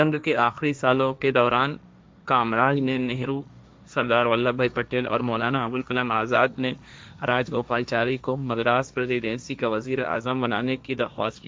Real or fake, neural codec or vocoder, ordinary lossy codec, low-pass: fake; codec, 16 kHz, 1.1 kbps, Voila-Tokenizer; none; none